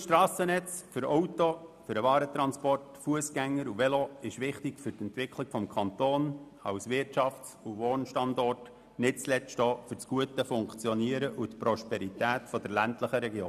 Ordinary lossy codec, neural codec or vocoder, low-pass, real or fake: none; none; 14.4 kHz; real